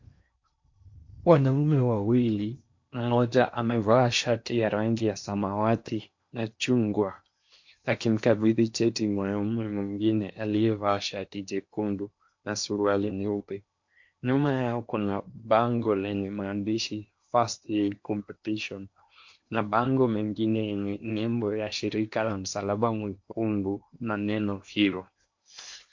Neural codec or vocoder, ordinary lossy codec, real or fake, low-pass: codec, 16 kHz in and 24 kHz out, 0.8 kbps, FocalCodec, streaming, 65536 codes; MP3, 48 kbps; fake; 7.2 kHz